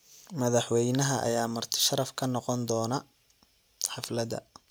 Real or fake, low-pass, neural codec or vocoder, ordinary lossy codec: real; none; none; none